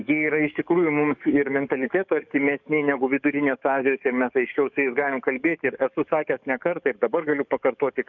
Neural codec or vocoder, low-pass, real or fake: codec, 44.1 kHz, 7.8 kbps, DAC; 7.2 kHz; fake